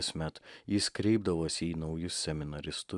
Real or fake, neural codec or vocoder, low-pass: real; none; 10.8 kHz